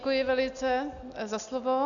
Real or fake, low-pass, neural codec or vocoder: real; 7.2 kHz; none